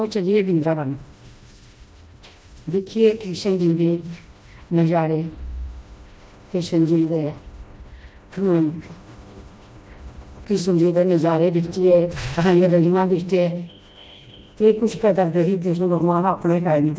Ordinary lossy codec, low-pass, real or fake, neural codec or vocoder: none; none; fake; codec, 16 kHz, 1 kbps, FreqCodec, smaller model